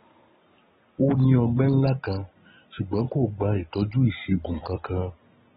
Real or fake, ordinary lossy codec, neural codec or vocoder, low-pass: real; AAC, 16 kbps; none; 7.2 kHz